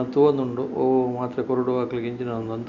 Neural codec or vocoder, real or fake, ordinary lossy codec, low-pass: none; real; none; 7.2 kHz